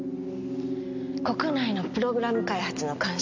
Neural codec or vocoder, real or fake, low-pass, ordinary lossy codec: none; real; 7.2 kHz; MP3, 64 kbps